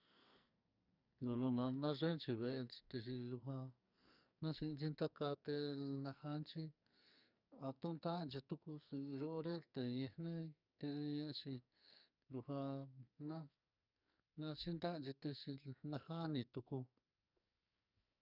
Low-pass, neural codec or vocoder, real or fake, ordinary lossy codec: 5.4 kHz; codec, 44.1 kHz, 2.6 kbps, SNAC; fake; none